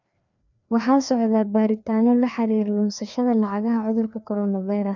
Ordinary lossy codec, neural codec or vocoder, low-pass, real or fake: none; codec, 16 kHz, 2 kbps, FreqCodec, larger model; 7.2 kHz; fake